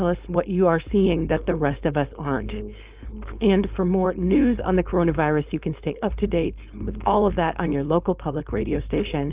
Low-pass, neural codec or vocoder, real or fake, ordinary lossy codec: 3.6 kHz; codec, 16 kHz, 4.8 kbps, FACodec; fake; Opus, 32 kbps